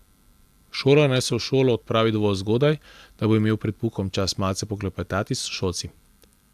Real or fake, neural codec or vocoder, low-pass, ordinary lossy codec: real; none; 14.4 kHz; AAC, 96 kbps